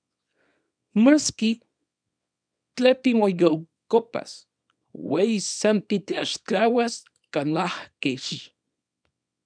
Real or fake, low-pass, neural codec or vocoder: fake; 9.9 kHz; codec, 24 kHz, 0.9 kbps, WavTokenizer, small release